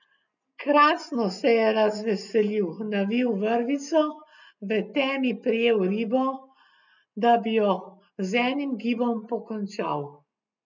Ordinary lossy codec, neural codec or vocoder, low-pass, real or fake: none; none; 7.2 kHz; real